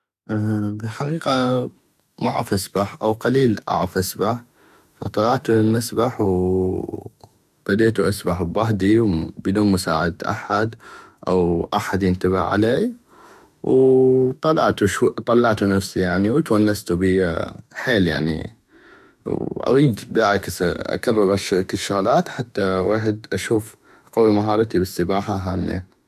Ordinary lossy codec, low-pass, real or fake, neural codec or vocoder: none; 14.4 kHz; fake; autoencoder, 48 kHz, 32 numbers a frame, DAC-VAE, trained on Japanese speech